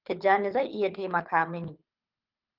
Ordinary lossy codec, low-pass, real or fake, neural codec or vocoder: Opus, 24 kbps; 5.4 kHz; fake; codec, 24 kHz, 6 kbps, HILCodec